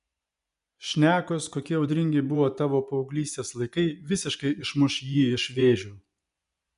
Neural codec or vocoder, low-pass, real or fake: vocoder, 24 kHz, 100 mel bands, Vocos; 10.8 kHz; fake